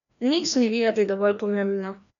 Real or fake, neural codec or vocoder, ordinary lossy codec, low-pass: fake; codec, 16 kHz, 1 kbps, FreqCodec, larger model; MP3, 96 kbps; 7.2 kHz